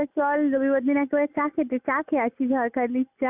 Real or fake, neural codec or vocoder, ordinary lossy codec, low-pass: real; none; Opus, 64 kbps; 3.6 kHz